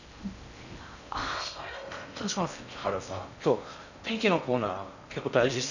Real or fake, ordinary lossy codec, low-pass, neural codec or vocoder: fake; none; 7.2 kHz; codec, 16 kHz in and 24 kHz out, 0.6 kbps, FocalCodec, streaming, 4096 codes